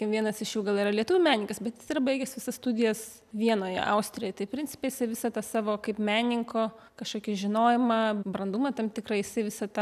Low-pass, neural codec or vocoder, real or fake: 14.4 kHz; none; real